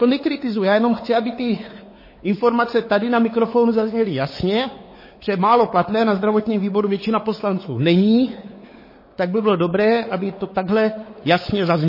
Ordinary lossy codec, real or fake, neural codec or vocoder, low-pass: MP3, 24 kbps; fake; codec, 16 kHz, 4 kbps, X-Codec, WavLM features, trained on Multilingual LibriSpeech; 5.4 kHz